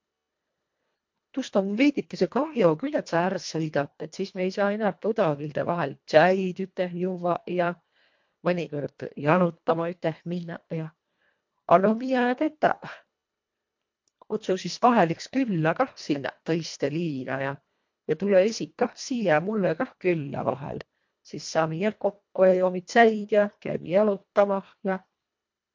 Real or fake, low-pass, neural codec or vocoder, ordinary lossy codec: fake; 7.2 kHz; codec, 24 kHz, 1.5 kbps, HILCodec; MP3, 48 kbps